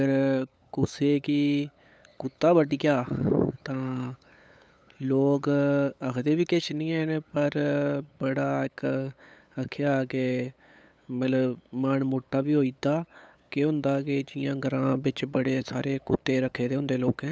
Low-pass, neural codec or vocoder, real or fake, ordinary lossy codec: none; codec, 16 kHz, 8 kbps, FunCodec, trained on LibriTTS, 25 frames a second; fake; none